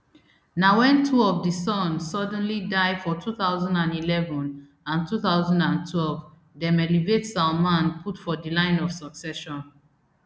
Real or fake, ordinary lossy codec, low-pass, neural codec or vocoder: real; none; none; none